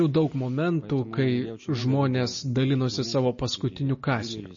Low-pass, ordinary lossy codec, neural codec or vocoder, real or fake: 7.2 kHz; MP3, 32 kbps; none; real